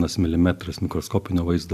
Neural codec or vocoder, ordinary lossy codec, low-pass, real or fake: none; AAC, 96 kbps; 14.4 kHz; real